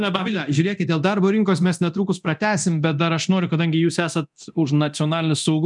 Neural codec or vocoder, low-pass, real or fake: codec, 24 kHz, 0.9 kbps, DualCodec; 10.8 kHz; fake